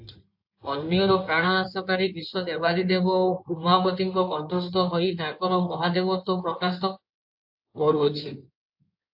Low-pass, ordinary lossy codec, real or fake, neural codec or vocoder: 5.4 kHz; AAC, 48 kbps; fake; codec, 16 kHz in and 24 kHz out, 2.2 kbps, FireRedTTS-2 codec